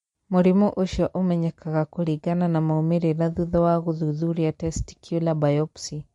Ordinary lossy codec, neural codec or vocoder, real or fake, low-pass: MP3, 48 kbps; none; real; 14.4 kHz